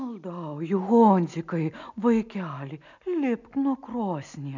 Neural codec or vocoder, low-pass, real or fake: none; 7.2 kHz; real